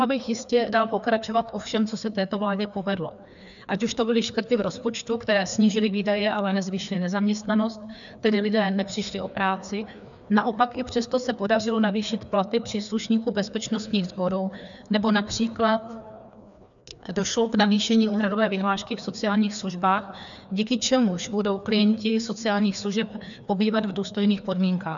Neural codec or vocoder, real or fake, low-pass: codec, 16 kHz, 2 kbps, FreqCodec, larger model; fake; 7.2 kHz